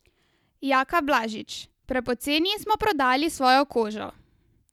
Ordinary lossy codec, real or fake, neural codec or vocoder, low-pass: none; real; none; 19.8 kHz